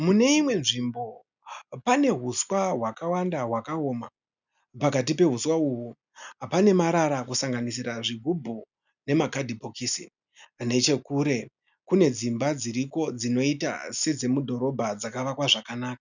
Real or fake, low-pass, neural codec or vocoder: real; 7.2 kHz; none